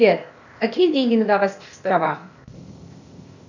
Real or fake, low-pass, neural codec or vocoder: fake; 7.2 kHz; codec, 16 kHz, 0.8 kbps, ZipCodec